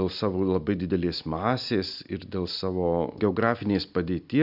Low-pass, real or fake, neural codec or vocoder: 5.4 kHz; real; none